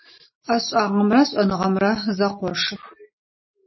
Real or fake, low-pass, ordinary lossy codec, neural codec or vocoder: real; 7.2 kHz; MP3, 24 kbps; none